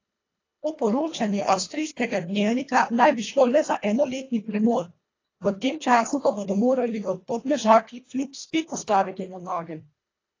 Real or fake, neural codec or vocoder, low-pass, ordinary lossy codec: fake; codec, 24 kHz, 1.5 kbps, HILCodec; 7.2 kHz; AAC, 32 kbps